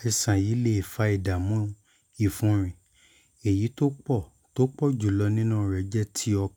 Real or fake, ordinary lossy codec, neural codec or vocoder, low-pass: real; none; none; 19.8 kHz